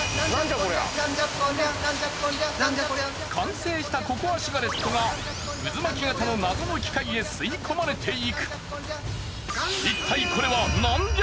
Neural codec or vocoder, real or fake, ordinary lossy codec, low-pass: none; real; none; none